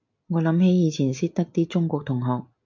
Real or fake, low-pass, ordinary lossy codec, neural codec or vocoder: real; 7.2 kHz; MP3, 64 kbps; none